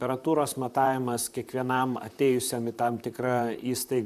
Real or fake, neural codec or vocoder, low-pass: fake; vocoder, 44.1 kHz, 128 mel bands, Pupu-Vocoder; 14.4 kHz